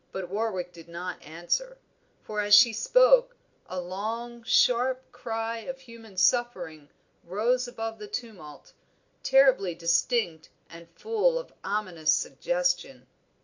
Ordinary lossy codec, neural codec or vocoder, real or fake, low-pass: AAC, 48 kbps; none; real; 7.2 kHz